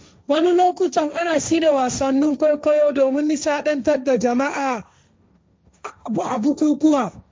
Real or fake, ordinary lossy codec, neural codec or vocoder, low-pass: fake; none; codec, 16 kHz, 1.1 kbps, Voila-Tokenizer; none